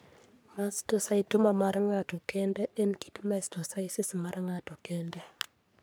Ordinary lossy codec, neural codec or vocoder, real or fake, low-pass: none; codec, 44.1 kHz, 3.4 kbps, Pupu-Codec; fake; none